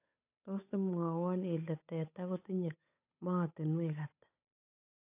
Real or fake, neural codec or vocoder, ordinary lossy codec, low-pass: fake; codec, 16 kHz, 8 kbps, FunCodec, trained on Chinese and English, 25 frames a second; AAC, 32 kbps; 3.6 kHz